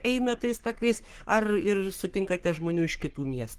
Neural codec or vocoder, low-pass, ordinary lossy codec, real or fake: codec, 44.1 kHz, 3.4 kbps, Pupu-Codec; 14.4 kHz; Opus, 24 kbps; fake